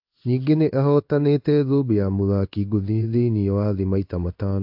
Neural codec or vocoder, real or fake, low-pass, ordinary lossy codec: codec, 16 kHz in and 24 kHz out, 1 kbps, XY-Tokenizer; fake; 5.4 kHz; AAC, 48 kbps